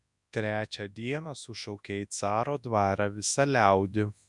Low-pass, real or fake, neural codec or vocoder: 10.8 kHz; fake; codec, 24 kHz, 0.9 kbps, WavTokenizer, large speech release